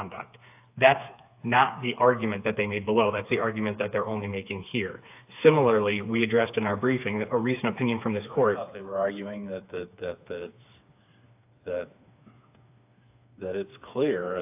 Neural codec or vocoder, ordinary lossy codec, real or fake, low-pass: codec, 16 kHz, 4 kbps, FreqCodec, smaller model; AAC, 32 kbps; fake; 3.6 kHz